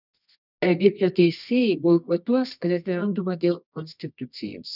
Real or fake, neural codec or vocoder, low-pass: fake; codec, 24 kHz, 0.9 kbps, WavTokenizer, medium music audio release; 5.4 kHz